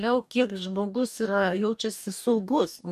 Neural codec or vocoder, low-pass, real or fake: codec, 44.1 kHz, 2.6 kbps, DAC; 14.4 kHz; fake